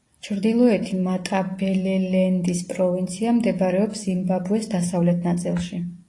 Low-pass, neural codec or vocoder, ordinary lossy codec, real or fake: 10.8 kHz; none; AAC, 48 kbps; real